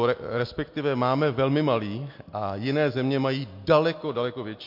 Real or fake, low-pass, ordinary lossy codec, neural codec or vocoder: real; 5.4 kHz; MP3, 32 kbps; none